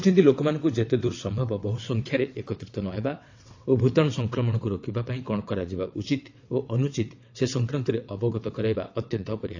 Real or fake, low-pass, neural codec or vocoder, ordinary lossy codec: fake; 7.2 kHz; vocoder, 22.05 kHz, 80 mel bands, WaveNeXt; AAC, 48 kbps